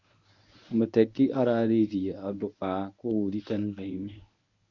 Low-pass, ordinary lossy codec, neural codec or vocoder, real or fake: 7.2 kHz; none; codec, 24 kHz, 0.9 kbps, WavTokenizer, medium speech release version 1; fake